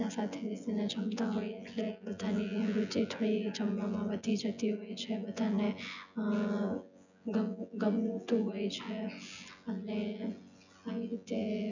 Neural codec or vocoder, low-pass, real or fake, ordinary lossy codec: vocoder, 24 kHz, 100 mel bands, Vocos; 7.2 kHz; fake; none